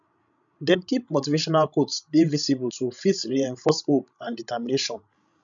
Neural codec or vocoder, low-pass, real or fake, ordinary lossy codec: codec, 16 kHz, 16 kbps, FreqCodec, larger model; 7.2 kHz; fake; none